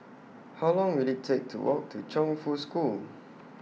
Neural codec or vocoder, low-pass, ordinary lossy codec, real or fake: none; none; none; real